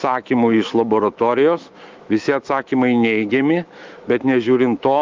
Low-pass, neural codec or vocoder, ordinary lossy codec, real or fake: 7.2 kHz; autoencoder, 48 kHz, 128 numbers a frame, DAC-VAE, trained on Japanese speech; Opus, 32 kbps; fake